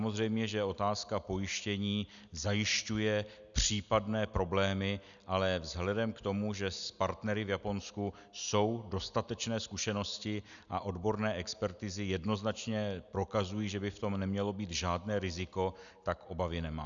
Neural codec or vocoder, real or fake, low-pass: none; real; 7.2 kHz